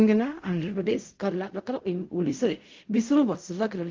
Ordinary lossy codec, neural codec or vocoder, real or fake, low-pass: Opus, 32 kbps; codec, 16 kHz in and 24 kHz out, 0.4 kbps, LongCat-Audio-Codec, fine tuned four codebook decoder; fake; 7.2 kHz